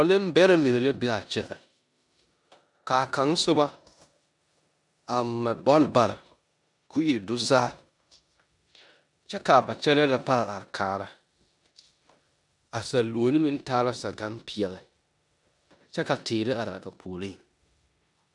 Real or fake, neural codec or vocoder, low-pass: fake; codec, 16 kHz in and 24 kHz out, 0.9 kbps, LongCat-Audio-Codec, four codebook decoder; 10.8 kHz